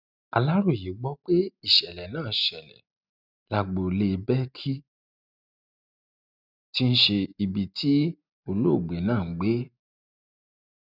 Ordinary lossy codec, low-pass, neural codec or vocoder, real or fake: none; 5.4 kHz; none; real